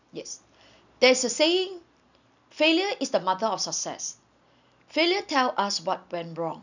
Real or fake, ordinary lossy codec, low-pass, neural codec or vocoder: real; none; 7.2 kHz; none